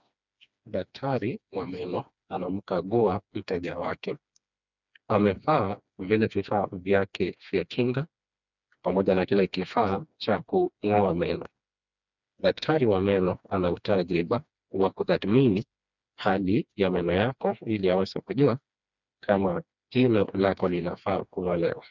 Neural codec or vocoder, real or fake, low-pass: codec, 16 kHz, 2 kbps, FreqCodec, smaller model; fake; 7.2 kHz